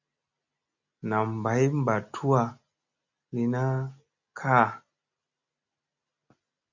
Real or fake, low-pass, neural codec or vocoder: real; 7.2 kHz; none